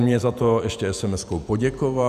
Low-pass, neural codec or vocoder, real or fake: 14.4 kHz; vocoder, 48 kHz, 128 mel bands, Vocos; fake